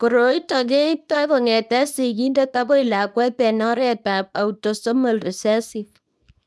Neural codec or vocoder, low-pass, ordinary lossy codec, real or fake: codec, 24 kHz, 0.9 kbps, WavTokenizer, small release; none; none; fake